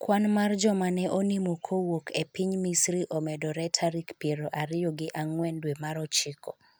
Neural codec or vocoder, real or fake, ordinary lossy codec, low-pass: none; real; none; none